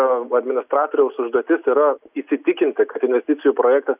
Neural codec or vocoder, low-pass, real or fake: none; 3.6 kHz; real